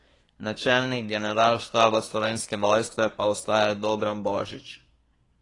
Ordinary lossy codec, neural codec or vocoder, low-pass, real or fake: AAC, 32 kbps; codec, 24 kHz, 1 kbps, SNAC; 10.8 kHz; fake